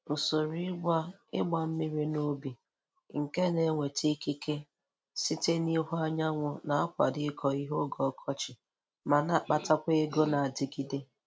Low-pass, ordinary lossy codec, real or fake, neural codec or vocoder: none; none; real; none